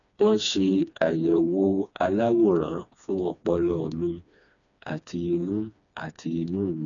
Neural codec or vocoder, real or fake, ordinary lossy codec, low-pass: codec, 16 kHz, 2 kbps, FreqCodec, smaller model; fake; none; 7.2 kHz